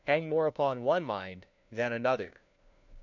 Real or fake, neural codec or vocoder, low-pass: fake; codec, 16 kHz, 1 kbps, FunCodec, trained on LibriTTS, 50 frames a second; 7.2 kHz